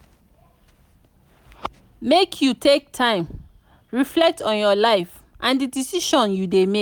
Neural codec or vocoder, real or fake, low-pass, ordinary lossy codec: none; real; none; none